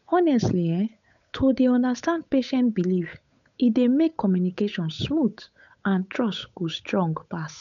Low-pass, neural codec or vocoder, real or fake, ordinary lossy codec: 7.2 kHz; codec, 16 kHz, 8 kbps, FunCodec, trained on Chinese and English, 25 frames a second; fake; none